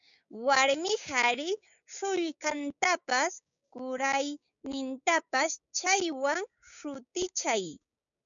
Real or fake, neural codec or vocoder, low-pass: fake; codec, 16 kHz, 6 kbps, DAC; 7.2 kHz